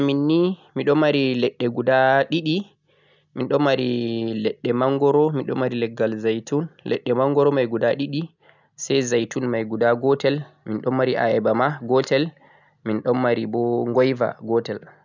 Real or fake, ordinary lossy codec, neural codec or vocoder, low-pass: real; none; none; 7.2 kHz